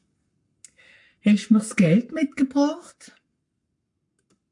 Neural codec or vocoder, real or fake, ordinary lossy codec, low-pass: codec, 44.1 kHz, 7.8 kbps, Pupu-Codec; fake; MP3, 96 kbps; 10.8 kHz